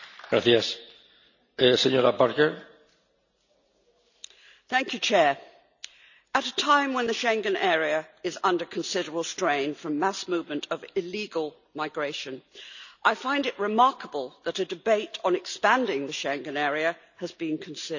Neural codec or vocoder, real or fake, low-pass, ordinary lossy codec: none; real; 7.2 kHz; none